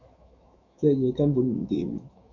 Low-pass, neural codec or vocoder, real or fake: 7.2 kHz; autoencoder, 48 kHz, 128 numbers a frame, DAC-VAE, trained on Japanese speech; fake